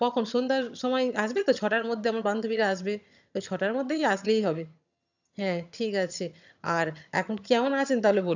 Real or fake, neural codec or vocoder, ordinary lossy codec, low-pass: fake; vocoder, 22.05 kHz, 80 mel bands, HiFi-GAN; none; 7.2 kHz